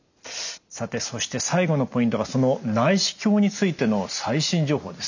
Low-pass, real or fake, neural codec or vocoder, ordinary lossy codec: 7.2 kHz; real; none; none